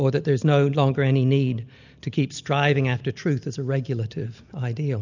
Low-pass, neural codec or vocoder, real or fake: 7.2 kHz; none; real